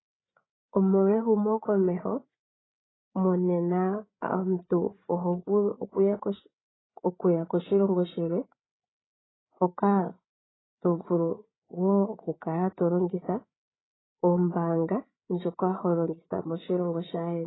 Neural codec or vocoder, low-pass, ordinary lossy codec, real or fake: codec, 16 kHz, 8 kbps, FreqCodec, larger model; 7.2 kHz; AAC, 16 kbps; fake